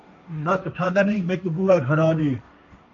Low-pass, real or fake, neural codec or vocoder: 7.2 kHz; fake; codec, 16 kHz, 1.1 kbps, Voila-Tokenizer